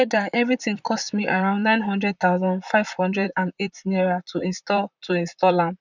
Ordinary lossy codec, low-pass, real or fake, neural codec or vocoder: none; 7.2 kHz; fake; vocoder, 44.1 kHz, 128 mel bands, Pupu-Vocoder